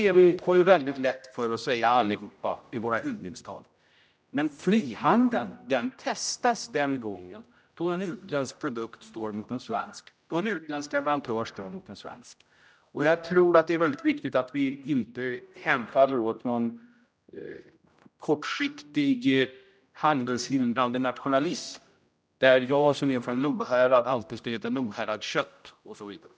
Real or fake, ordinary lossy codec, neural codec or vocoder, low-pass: fake; none; codec, 16 kHz, 0.5 kbps, X-Codec, HuBERT features, trained on general audio; none